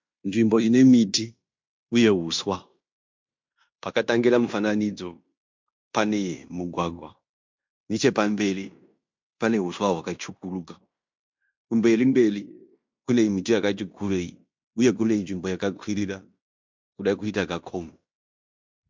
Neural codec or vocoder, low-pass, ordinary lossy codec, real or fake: codec, 16 kHz in and 24 kHz out, 0.9 kbps, LongCat-Audio-Codec, fine tuned four codebook decoder; 7.2 kHz; MP3, 64 kbps; fake